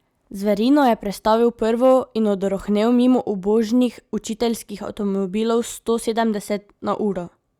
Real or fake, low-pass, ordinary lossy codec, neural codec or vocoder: real; 19.8 kHz; Opus, 64 kbps; none